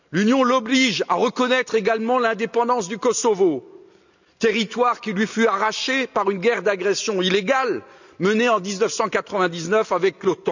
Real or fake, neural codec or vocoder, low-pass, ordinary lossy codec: real; none; 7.2 kHz; none